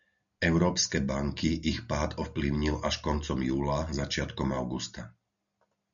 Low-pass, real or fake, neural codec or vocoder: 7.2 kHz; real; none